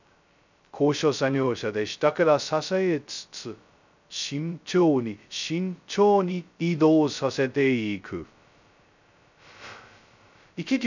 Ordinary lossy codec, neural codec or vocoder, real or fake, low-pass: none; codec, 16 kHz, 0.2 kbps, FocalCodec; fake; 7.2 kHz